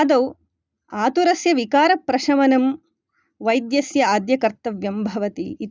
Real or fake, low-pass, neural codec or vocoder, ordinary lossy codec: real; none; none; none